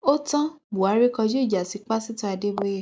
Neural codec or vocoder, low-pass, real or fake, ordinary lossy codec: none; none; real; none